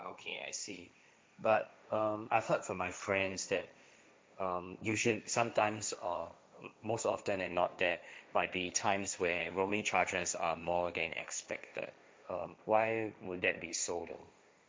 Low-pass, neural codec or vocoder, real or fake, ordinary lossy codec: none; codec, 16 kHz, 1.1 kbps, Voila-Tokenizer; fake; none